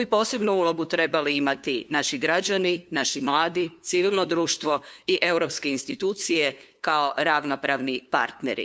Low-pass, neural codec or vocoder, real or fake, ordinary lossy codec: none; codec, 16 kHz, 2 kbps, FunCodec, trained on LibriTTS, 25 frames a second; fake; none